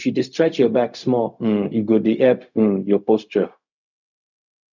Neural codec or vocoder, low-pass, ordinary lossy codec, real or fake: codec, 16 kHz, 0.4 kbps, LongCat-Audio-Codec; 7.2 kHz; none; fake